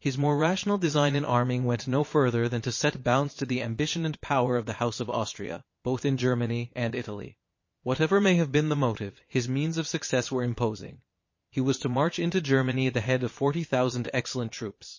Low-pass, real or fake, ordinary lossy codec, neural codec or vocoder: 7.2 kHz; fake; MP3, 32 kbps; vocoder, 22.05 kHz, 80 mel bands, Vocos